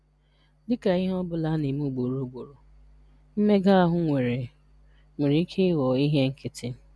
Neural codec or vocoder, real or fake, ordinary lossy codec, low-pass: none; real; none; none